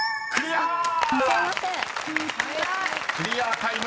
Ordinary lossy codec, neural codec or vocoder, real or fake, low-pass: none; none; real; none